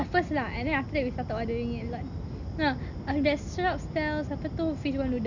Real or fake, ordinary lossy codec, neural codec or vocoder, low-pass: real; none; none; 7.2 kHz